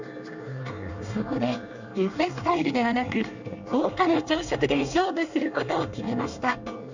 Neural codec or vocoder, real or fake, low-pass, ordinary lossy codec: codec, 24 kHz, 1 kbps, SNAC; fake; 7.2 kHz; none